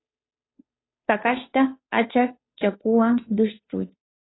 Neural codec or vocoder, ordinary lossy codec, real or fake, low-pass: codec, 16 kHz, 2 kbps, FunCodec, trained on Chinese and English, 25 frames a second; AAC, 16 kbps; fake; 7.2 kHz